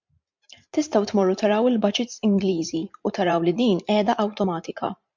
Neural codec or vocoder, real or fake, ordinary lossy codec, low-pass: vocoder, 44.1 kHz, 128 mel bands every 512 samples, BigVGAN v2; fake; MP3, 64 kbps; 7.2 kHz